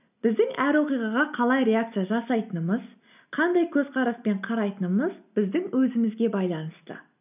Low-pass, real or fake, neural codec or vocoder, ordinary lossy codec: 3.6 kHz; real; none; none